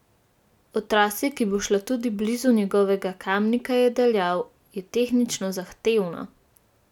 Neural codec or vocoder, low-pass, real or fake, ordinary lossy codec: none; 19.8 kHz; real; none